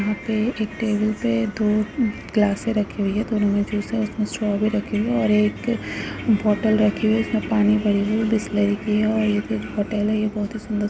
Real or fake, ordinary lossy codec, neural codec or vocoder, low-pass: real; none; none; none